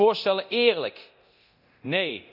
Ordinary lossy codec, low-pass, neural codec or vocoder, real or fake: none; 5.4 kHz; codec, 24 kHz, 0.9 kbps, DualCodec; fake